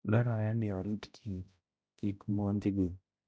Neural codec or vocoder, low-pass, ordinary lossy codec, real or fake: codec, 16 kHz, 0.5 kbps, X-Codec, HuBERT features, trained on balanced general audio; none; none; fake